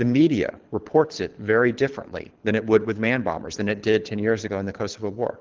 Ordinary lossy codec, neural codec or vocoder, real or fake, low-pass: Opus, 16 kbps; codec, 24 kHz, 6 kbps, HILCodec; fake; 7.2 kHz